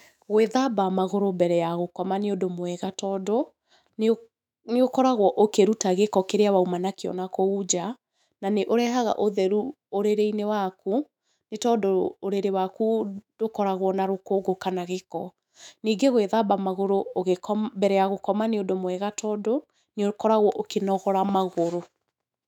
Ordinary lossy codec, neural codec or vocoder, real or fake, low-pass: none; autoencoder, 48 kHz, 128 numbers a frame, DAC-VAE, trained on Japanese speech; fake; 19.8 kHz